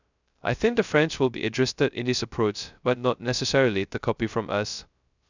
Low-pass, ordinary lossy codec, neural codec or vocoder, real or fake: 7.2 kHz; none; codec, 16 kHz, 0.2 kbps, FocalCodec; fake